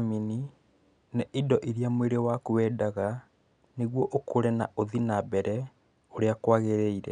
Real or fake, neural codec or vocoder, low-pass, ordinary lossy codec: real; none; 9.9 kHz; none